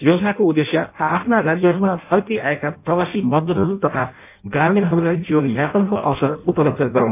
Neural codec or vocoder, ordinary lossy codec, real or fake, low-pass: codec, 16 kHz in and 24 kHz out, 0.6 kbps, FireRedTTS-2 codec; none; fake; 3.6 kHz